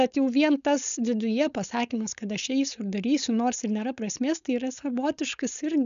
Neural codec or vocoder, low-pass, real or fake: codec, 16 kHz, 4.8 kbps, FACodec; 7.2 kHz; fake